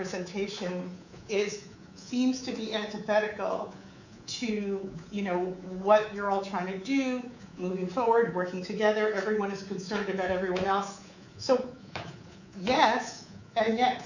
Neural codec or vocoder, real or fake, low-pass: codec, 24 kHz, 3.1 kbps, DualCodec; fake; 7.2 kHz